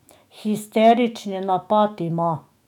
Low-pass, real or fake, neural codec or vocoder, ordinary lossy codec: 19.8 kHz; fake; autoencoder, 48 kHz, 128 numbers a frame, DAC-VAE, trained on Japanese speech; none